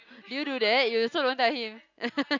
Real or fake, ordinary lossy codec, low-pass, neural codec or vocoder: real; none; 7.2 kHz; none